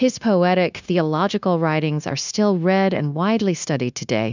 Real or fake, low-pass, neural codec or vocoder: fake; 7.2 kHz; codec, 16 kHz, 0.9 kbps, LongCat-Audio-Codec